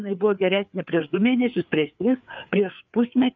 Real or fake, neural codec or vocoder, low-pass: fake; codec, 16 kHz, 4 kbps, FreqCodec, larger model; 7.2 kHz